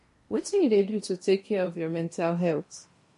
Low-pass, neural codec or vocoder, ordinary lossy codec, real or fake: 10.8 kHz; codec, 16 kHz in and 24 kHz out, 0.8 kbps, FocalCodec, streaming, 65536 codes; MP3, 48 kbps; fake